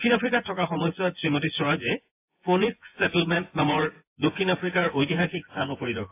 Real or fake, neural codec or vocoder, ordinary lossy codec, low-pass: fake; vocoder, 24 kHz, 100 mel bands, Vocos; AAC, 24 kbps; 3.6 kHz